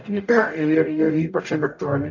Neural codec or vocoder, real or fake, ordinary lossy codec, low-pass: codec, 44.1 kHz, 0.9 kbps, DAC; fake; AAC, 48 kbps; 7.2 kHz